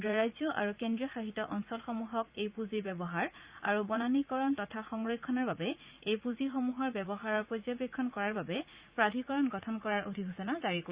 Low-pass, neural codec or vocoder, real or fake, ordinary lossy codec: 3.6 kHz; vocoder, 44.1 kHz, 80 mel bands, Vocos; fake; Opus, 64 kbps